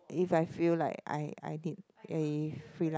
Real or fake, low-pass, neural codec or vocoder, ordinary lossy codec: real; none; none; none